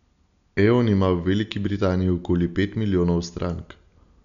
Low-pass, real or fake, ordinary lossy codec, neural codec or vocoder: 7.2 kHz; real; none; none